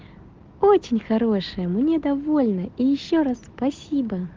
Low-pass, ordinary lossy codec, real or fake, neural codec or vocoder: 7.2 kHz; Opus, 16 kbps; real; none